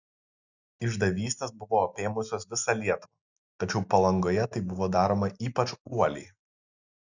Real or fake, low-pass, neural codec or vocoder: real; 7.2 kHz; none